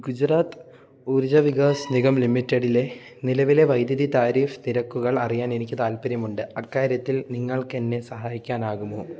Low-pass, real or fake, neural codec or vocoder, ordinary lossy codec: none; real; none; none